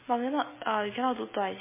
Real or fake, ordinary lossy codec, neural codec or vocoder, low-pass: real; MP3, 16 kbps; none; 3.6 kHz